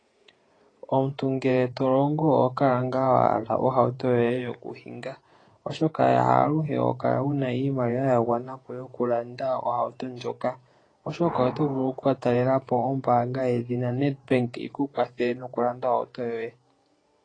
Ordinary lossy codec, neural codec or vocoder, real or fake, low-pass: AAC, 32 kbps; vocoder, 48 kHz, 128 mel bands, Vocos; fake; 9.9 kHz